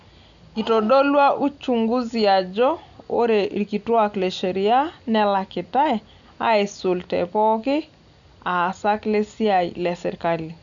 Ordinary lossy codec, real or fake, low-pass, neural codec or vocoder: none; real; 7.2 kHz; none